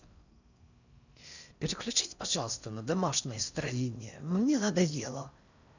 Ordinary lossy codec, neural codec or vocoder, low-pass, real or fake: none; codec, 16 kHz in and 24 kHz out, 0.6 kbps, FocalCodec, streaming, 4096 codes; 7.2 kHz; fake